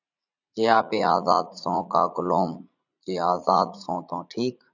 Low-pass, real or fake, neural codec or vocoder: 7.2 kHz; fake; vocoder, 44.1 kHz, 80 mel bands, Vocos